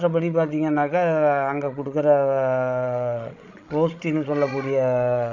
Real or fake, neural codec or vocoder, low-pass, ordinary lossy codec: fake; codec, 16 kHz, 16 kbps, FreqCodec, larger model; 7.2 kHz; none